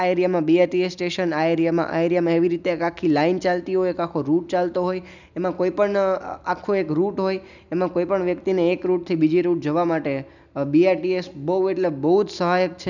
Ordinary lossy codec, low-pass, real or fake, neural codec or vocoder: none; 7.2 kHz; real; none